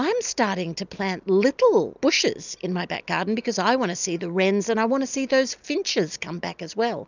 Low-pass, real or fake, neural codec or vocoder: 7.2 kHz; real; none